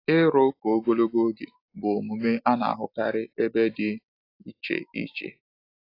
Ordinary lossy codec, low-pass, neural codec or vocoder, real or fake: AAC, 32 kbps; 5.4 kHz; none; real